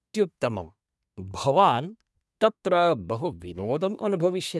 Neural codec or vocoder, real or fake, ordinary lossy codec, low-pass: codec, 24 kHz, 1 kbps, SNAC; fake; none; none